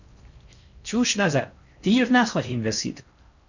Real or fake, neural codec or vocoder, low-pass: fake; codec, 16 kHz in and 24 kHz out, 0.6 kbps, FocalCodec, streaming, 4096 codes; 7.2 kHz